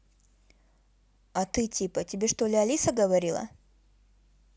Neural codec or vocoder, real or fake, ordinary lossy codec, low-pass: none; real; none; none